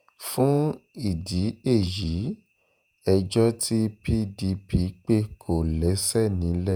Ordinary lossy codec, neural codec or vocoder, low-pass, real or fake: none; vocoder, 48 kHz, 128 mel bands, Vocos; none; fake